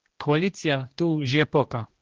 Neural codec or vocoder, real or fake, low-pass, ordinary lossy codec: codec, 16 kHz, 1 kbps, X-Codec, HuBERT features, trained on general audio; fake; 7.2 kHz; Opus, 16 kbps